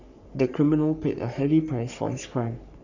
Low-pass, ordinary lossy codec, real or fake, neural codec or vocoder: 7.2 kHz; none; fake; codec, 44.1 kHz, 3.4 kbps, Pupu-Codec